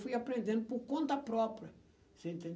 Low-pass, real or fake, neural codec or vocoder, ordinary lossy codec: none; real; none; none